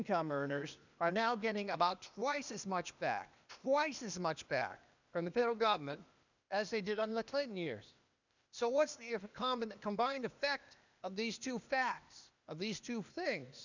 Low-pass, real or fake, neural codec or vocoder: 7.2 kHz; fake; codec, 16 kHz, 0.8 kbps, ZipCodec